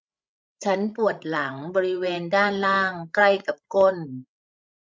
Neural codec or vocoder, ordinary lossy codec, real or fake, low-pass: codec, 16 kHz, 16 kbps, FreqCodec, larger model; none; fake; none